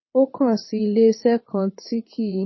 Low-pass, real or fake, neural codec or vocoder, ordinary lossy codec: 7.2 kHz; fake; vocoder, 22.05 kHz, 80 mel bands, WaveNeXt; MP3, 24 kbps